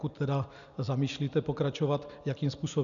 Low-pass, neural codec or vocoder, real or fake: 7.2 kHz; none; real